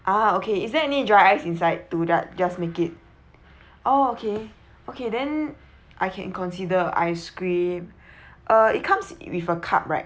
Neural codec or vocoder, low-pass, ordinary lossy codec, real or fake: none; none; none; real